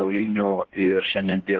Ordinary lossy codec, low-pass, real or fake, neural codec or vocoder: Opus, 16 kbps; 7.2 kHz; fake; codec, 16 kHz in and 24 kHz out, 1.1 kbps, FireRedTTS-2 codec